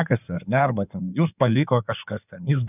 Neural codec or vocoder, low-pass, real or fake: codec, 16 kHz in and 24 kHz out, 2.2 kbps, FireRedTTS-2 codec; 3.6 kHz; fake